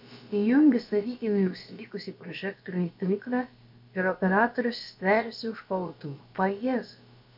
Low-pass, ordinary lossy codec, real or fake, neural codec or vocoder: 5.4 kHz; MP3, 48 kbps; fake; codec, 16 kHz, about 1 kbps, DyCAST, with the encoder's durations